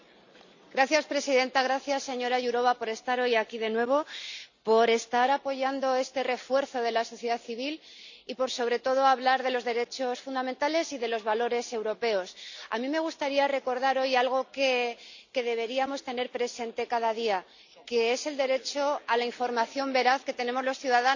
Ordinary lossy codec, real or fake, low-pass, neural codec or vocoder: none; real; 7.2 kHz; none